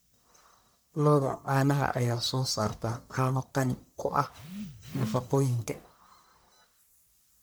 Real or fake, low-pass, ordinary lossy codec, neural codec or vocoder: fake; none; none; codec, 44.1 kHz, 1.7 kbps, Pupu-Codec